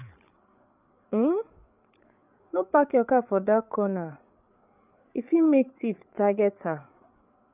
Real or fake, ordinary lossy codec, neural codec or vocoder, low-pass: fake; none; codec, 16 kHz, 8 kbps, FreqCodec, larger model; 3.6 kHz